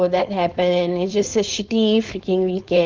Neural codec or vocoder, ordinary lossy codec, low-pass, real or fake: codec, 16 kHz, 4.8 kbps, FACodec; Opus, 24 kbps; 7.2 kHz; fake